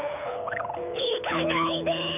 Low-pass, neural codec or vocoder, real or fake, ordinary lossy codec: 3.6 kHz; codec, 24 kHz, 6 kbps, HILCodec; fake; none